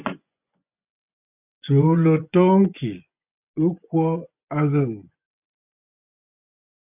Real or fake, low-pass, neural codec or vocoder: fake; 3.6 kHz; vocoder, 24 kHz, 100 mel bands, Vocos